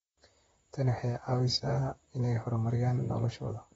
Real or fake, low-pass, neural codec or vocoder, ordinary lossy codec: fake; 19.8 kHz; vocoder, 44.1 kHz, 128 mel bands, Pupu-Vocoder; AAC, 24 kbps